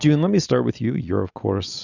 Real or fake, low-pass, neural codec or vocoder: real; 7.2 kHz; none